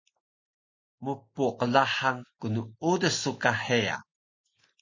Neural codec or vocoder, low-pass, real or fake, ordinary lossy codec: none; 7.2 kHz; real; MP3, 32 kbps